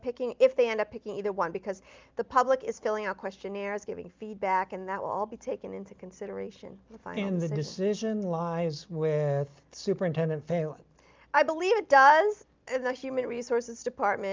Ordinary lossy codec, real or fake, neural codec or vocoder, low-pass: Opus, 24 kbps; real; none; 7.2 kHz